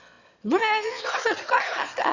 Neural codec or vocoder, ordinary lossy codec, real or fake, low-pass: autoencoder, 22.05 kHz, a latent of 192 numbers a frame, VITS, trained on one speaker; none; fake; 7.2 kHz